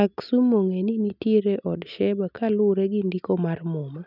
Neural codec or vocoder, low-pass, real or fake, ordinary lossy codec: none; 5.4 kHz; real; none